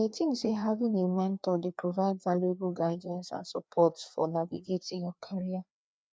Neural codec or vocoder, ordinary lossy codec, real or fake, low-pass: codec, 16 kHz, 2 kbps, FreqCodec, larger model; none; fake; none